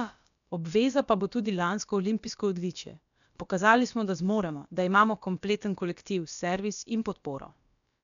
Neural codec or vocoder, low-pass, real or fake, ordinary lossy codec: codec, 16 kHz, about 1 kbps, DyCAST, with the encoder's durations; 7.2 kHz; fake; none